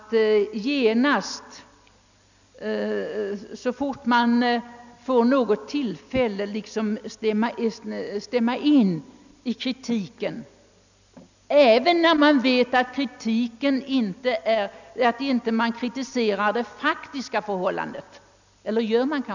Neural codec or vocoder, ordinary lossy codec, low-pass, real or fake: none; none; 7.2 kHz; real